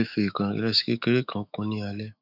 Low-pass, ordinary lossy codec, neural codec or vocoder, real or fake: 5.4 kHz; none; autoencoder, 48 kHz, 128 numbers a frame, DAC-VAE, trained on Japanese speech; fake